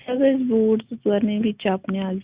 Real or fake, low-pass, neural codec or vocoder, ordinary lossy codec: real; 3.6 kHz; none; Opus, 64 kbps